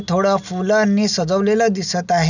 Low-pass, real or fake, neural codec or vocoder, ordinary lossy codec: 7.2 kHz; real; none; none